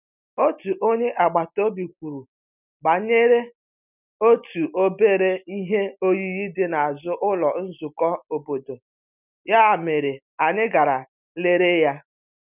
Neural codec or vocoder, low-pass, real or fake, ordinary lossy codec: none; 3.6 kHz; real; none